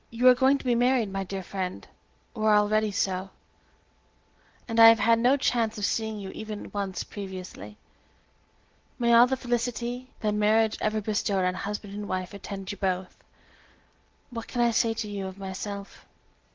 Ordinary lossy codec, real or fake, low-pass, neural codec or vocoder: Opus, 16 kbps; real; 7.2 kHz; none